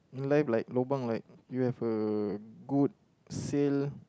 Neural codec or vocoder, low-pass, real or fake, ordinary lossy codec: none; none; real; none